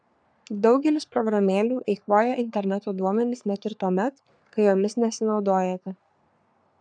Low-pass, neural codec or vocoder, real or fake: 9.9 kHz; codec, 44.1 kHz, 3.4 kbps, Pupu-Codec; fake